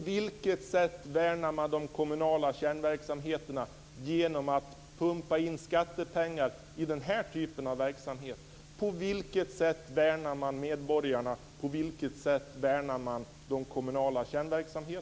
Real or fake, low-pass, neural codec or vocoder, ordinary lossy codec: real; none; none; none